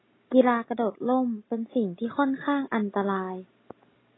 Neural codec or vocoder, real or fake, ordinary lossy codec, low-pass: none; real; AAC, 16 kbps; 7.2 kHz